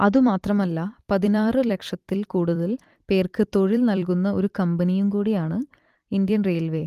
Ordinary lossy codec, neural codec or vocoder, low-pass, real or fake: Opus, 24 kbps; vocoder, 44.1 kHz, 128 mel bands every 256 samples, BigVGAN v2; 14.4 kHz; fake